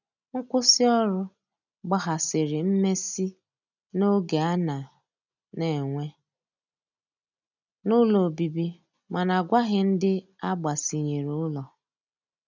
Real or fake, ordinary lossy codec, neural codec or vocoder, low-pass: real; none; none; 7.2 kHz